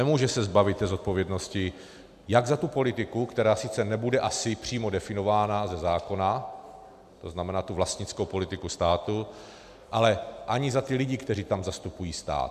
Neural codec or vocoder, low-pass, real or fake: none; 14.4 kHz; real